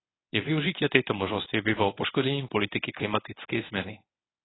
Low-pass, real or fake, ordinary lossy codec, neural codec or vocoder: 7.2 kHz; fake; AAC, 16 kbps; codec, 24 kHz, 0.9 kbps, WavTokenizer, medium speech release version 1